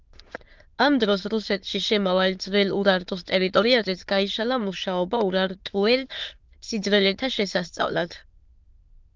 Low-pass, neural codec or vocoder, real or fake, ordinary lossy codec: 7.2 kHz; autoencoder, 22.05 kHz, a latent of 192 numbers a frame, VITS, trained on many speakers; fake; Opus, 32 kbps